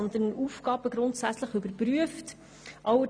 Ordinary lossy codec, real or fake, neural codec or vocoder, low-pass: none; real; none; 9.9 kHz